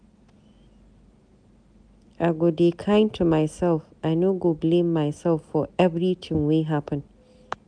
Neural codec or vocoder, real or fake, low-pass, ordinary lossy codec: none; real; 9.9 kHz; none